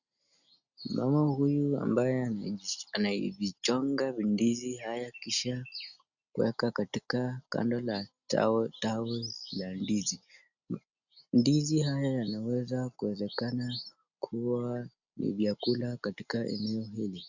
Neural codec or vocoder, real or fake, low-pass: none; real; 7.2 kHz